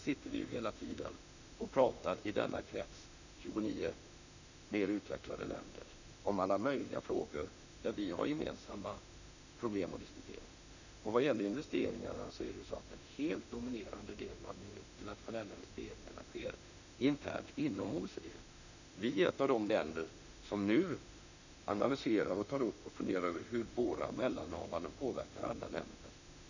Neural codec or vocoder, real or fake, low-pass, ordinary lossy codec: autoencoder, 48 kHz, 32 numbers a frame, DAC-VAE, trained on Japanese speech; fake; 7.2 kHz; none